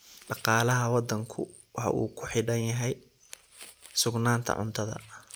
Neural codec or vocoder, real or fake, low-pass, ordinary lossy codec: none; real; none; none